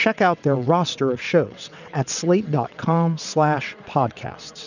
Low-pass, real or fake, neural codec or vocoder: 7.2 kHz; fake; vocoder, 22.05 kHz, 80 mel bands, WaveNeXt